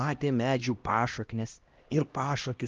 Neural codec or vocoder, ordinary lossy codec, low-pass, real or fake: codec, 16 kHz, 1 kbps, X-Codec, HuBERT features, trained on LibriSpeech; Opus, 32 kbps; 7.2 kHz; fake